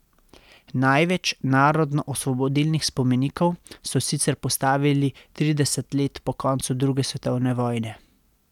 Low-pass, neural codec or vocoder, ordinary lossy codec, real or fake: 19.8 kHz; none; none; real